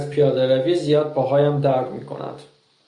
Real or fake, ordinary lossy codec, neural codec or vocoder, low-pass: real; AAC, 64 kbps; none; 10.8 kHz